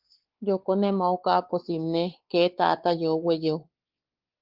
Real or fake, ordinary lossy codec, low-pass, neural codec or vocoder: fake; Opus, 16 kbps; 5.4 kHz; codec, 16 kHz, 4 kbps, X-Codec, WavLM features, trained on Multilingual LibriSpeech